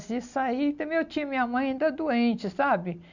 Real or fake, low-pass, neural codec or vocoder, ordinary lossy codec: real; 7.2 kHz; none; none